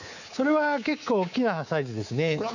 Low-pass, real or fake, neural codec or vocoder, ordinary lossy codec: 7.2 kHz; fake; codec, 24 kHz, 3.1 kbps, DualCodec; none